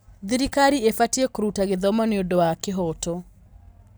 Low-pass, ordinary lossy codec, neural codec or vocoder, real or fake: none; none; none; real